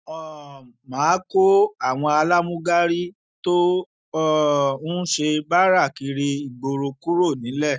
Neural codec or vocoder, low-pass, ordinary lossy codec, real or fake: none; none; none; real